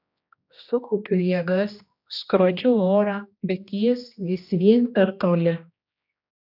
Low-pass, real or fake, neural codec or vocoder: 5.4 kHz; fake; codec, 16 kHz, 1 kbps, X-Codec, HuBERT features, trained on general audio